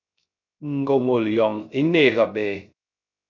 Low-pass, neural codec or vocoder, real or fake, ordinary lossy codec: 7.2 kHz; codec, 16 kHz, 0.3 kbps, FocalCodec; fake; MP3, 64 kbps